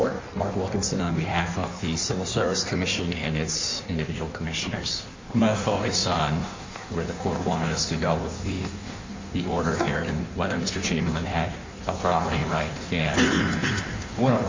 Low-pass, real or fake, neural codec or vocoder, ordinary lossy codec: 7.2 kHz; fake; codec, 16 kHz in and 24 kHz out, 1.1 kbps, FireRedTTS-2 codec; MP3, 64 kbps